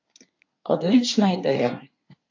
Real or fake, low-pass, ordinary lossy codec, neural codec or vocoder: fake; 7.2 kHz; AAC, 48 kbps; codec, 24 kHz, 1 kbps, SNAC